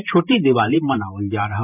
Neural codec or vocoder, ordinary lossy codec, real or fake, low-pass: vocoder, 44.1 kHz, 128 mel bands every 512 samples, BigVGAN v2; none; fake; 3.6 kHz